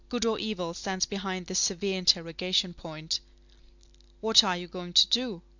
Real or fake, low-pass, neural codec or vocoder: real; 7.2 kHz; none